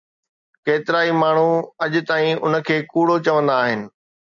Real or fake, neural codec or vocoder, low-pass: real; none; 7.2 kHz